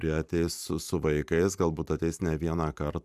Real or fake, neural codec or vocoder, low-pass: fake; vocoder, 44.1 kHz, 128 mel bands every 512 samples, BigVGAN v2; 14.4 kHz